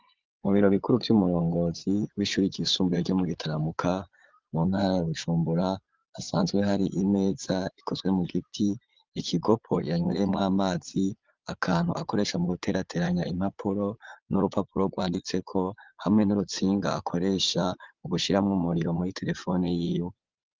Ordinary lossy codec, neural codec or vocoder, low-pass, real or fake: Opus, 32 kbps; vocoder, 22.05 kHz, 80 mel bands, Vocos; 7.2 kHz; fake